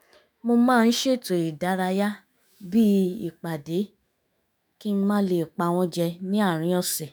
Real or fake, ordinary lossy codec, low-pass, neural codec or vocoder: fake; none; none; autoencoder, 48 kHz, 128 numbers a frame, DAC-VAE, trained on Japanese speech